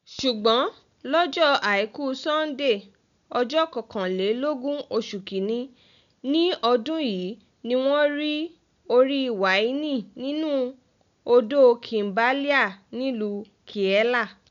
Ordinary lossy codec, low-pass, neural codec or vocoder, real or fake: none; 7.2 kHz; none; real